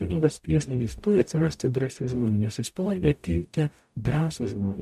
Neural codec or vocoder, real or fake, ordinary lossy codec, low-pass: codec, 44.1 kHz, 0.9 kbps, DAC; fake; MP3, 96 kbps; 14.4 kHz